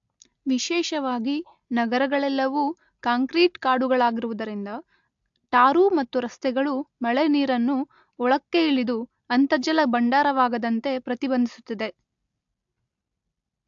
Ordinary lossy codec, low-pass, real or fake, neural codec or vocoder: AAC, 64 kbps; 7.2 kHz; real; none